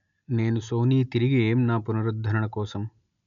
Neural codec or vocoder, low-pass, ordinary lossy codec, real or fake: none; 7.2 kHz; none; real